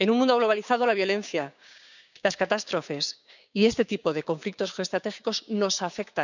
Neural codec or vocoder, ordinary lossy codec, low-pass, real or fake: codec, 16 kHz, 6 kbps, DAC; none; 7.2 kHz; fake